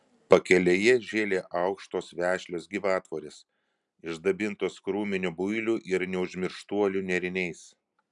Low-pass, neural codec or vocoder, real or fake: 10.8 kHz; none; real